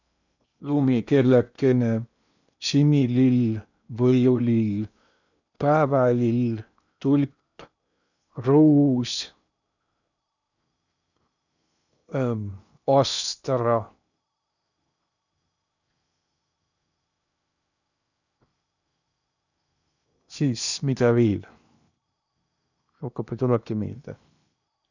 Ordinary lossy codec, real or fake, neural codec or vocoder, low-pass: none; fake; codec, 16 kHz in and 24 kHz out, 0.8 kbps, FocalCodec, streaming, 65536 codes; 7.2 kHz